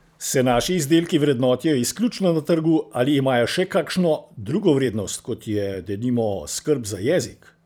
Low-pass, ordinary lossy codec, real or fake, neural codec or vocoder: none; none; fake; vocoder, 44.1 kHz, 128 mel bands every 512 samples, BigVGAN v2